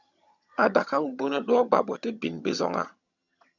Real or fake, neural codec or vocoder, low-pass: fake; vocoder, 22.05 kHz, 80 mel bands, HiFi-GAN; 7.2 kHz